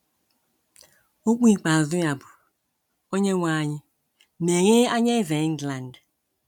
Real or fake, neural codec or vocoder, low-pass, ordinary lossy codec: real; none; 19.8 kHz; none